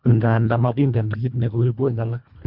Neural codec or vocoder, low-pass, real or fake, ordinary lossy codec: codec, 24 kHz, 1.5 kbps, HILCodec; 5.4 kHz; fake; Opus, 64 kbps